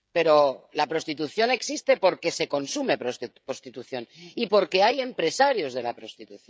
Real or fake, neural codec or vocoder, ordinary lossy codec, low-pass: fake; codec, 16 kHz, 16 kbps, FreqCodec, smaller model; none; none